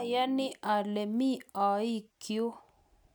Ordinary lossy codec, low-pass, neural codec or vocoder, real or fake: none; none; none; real